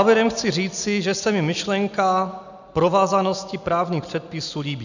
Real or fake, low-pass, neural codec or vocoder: real; 7.2 kHz; none